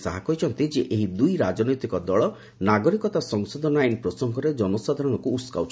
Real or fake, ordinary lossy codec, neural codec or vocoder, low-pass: real; none; none; none